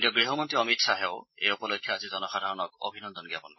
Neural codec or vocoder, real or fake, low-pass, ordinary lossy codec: none; real; 7.2 kHz; MP3, 24 kbps